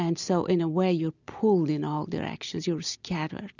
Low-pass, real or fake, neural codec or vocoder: 7.2 kHz; real; none